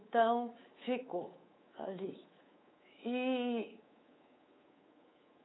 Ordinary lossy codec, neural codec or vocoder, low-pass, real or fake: AAC, 16 kbps; codec, 24 kHz, 3.1 kbps, DualCodec; 7.2 kHz; fake